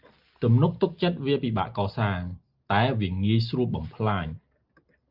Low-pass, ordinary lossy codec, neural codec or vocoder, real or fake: 5.4 kHz; Opus, 32 kbps; none; real